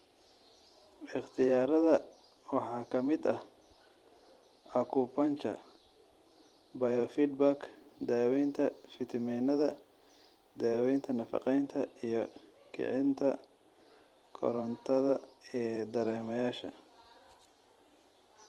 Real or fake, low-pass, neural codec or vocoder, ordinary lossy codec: fake; 19.8 kHz; vocoder, 44.1 kHz, 128 mel bands every 512 samples, BigVGAN v2; Opus, 24 kbps